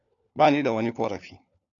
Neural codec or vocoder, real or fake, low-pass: codec, 16 kHz, 4 kbps, FunCodec, trained on LibriTTS, 50 frames a second; fake; 7.2 kHz